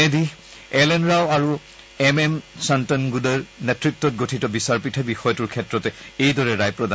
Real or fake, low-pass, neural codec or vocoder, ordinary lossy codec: real; none; none; none